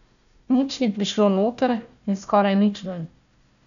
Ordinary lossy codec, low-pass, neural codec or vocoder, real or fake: none; 7.2 kHz; codec, 16 kHz, 1 kbps, FunCodec, trained on Chinese and English, 50 frames a second; fake